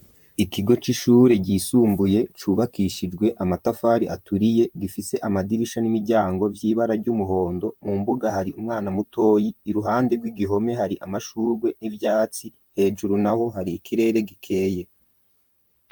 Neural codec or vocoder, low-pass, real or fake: vocoder, 44.1 kHz, 128 mel bands, Pupu-Vocoder; 19.8 kHz; fake